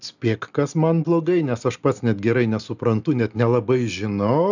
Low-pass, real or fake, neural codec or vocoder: 7.2 kHz; real; none